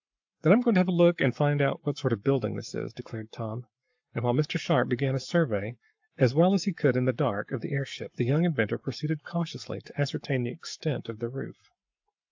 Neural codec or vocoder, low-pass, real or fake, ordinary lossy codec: codec, 44.1 kHz, 7.8 kbps, Pupu-Codec; 7.2 kHz; fake; AAC, 48 kbps